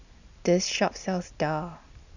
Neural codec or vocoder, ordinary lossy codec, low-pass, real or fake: none; none; 7.2 kHz; real